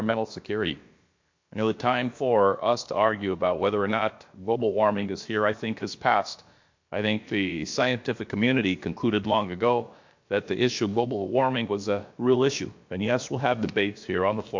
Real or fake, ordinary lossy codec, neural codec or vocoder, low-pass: fake; MP3, 48 kbps; codec, 16 kHz, about 1 kbps, DyCAST, with the encoder's durations; 7.2 kHz